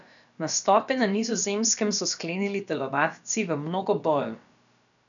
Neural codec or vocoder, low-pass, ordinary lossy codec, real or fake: codec, 16 kHz, about 1 kbps, DyCAST, with the encoder's durations; 7.2 kHz; none; fake